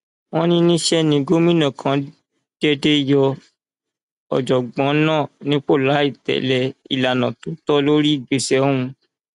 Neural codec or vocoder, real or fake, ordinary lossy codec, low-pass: none; real; none; 10.8 kHz